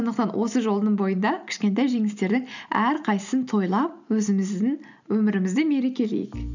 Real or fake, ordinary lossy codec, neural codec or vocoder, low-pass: real; none; none; 7.2 kHz